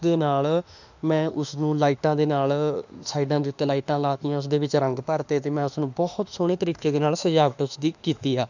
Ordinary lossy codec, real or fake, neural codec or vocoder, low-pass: none; fake; autoencoder, 48 kHz, 32 numbers a frame, DAC-VAE, trained on Japanese speech; 7.2 kHz